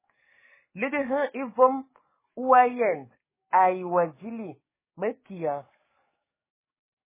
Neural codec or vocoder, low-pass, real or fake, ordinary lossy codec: codec, 44.1 kHz, 7.8 kbps, DAC; 3.6 kHz; fake; MP3, 16 kbps